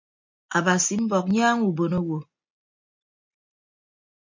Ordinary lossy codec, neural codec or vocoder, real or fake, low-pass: MP3, 64 kbps; none; real; 7.2 kHz